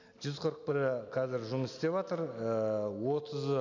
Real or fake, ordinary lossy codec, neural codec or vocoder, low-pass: real; none; none; 7.2 kHz